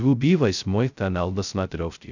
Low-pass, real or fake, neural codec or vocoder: 7.2 kHz; fake; codec, 16 kHz, 0.2 kbps, FocalCodec